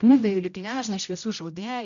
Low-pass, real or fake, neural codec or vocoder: 7.2 kHz; fake; codec, 16 kHz, 0.5 kbps, X-Codec, HuBERT features, trained on general audio